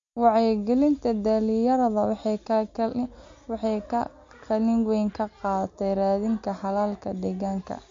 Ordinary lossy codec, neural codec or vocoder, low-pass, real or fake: MP3, 48 kbps; none; 7.2 kHz; real